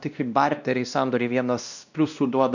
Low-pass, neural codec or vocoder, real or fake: 7.2 kHz; codec, 16 kHz, 1 kbps, X-Codec, WavLM features, trained on Multilingual LibriSpeech; fake